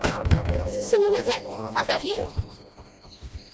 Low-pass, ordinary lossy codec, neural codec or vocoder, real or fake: none; none; codec, 16 kHz, 1 kbps, FreqCodec, smaller model; fake